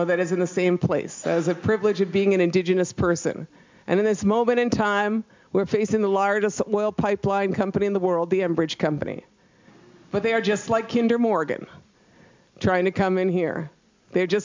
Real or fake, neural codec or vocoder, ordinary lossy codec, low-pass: real; none; MP3, 64 kbps; 7.2 kHz